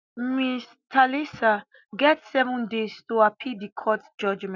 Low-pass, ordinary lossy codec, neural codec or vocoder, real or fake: 7.2 kHz; none; none; real